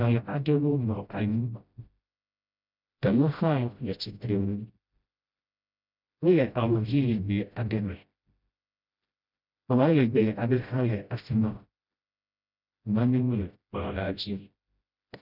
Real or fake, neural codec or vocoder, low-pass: fake; codec, 16 kHz, 0.5 kbps, FreqCodec, smaller model; 5.4 kHz